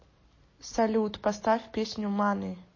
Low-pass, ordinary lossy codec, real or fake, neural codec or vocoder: 7.2 kHz; MP3, 32 kbps; real; none